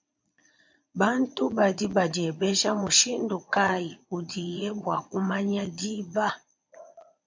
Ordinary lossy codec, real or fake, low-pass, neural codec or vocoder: MP3, 64 kbps; fake; 7.2 kHz; vocoder, 22.05 kHz, 80 mel bands, Vocos